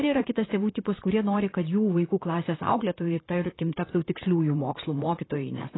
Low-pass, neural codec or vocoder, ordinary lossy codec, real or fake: 7.2 kHz; vocoder, 44.1 kHz, 128 mel bands, Pupu-Vocoder; AAC, 16 kbps; fake